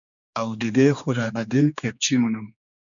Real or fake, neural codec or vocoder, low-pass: fake; codec, 16 kHz, 2 kbps, X-Codec, HuBERT features, trained on general audio; 7.2 kHz